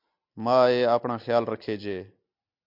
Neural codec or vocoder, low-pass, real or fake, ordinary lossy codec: none; 5.4 kHz; real; MP3, 48 kbps